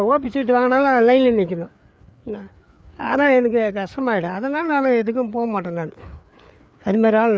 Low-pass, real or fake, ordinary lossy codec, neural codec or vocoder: none; fake; none; codec, 16 kHz, 4 kbps, FreqCodec, larger model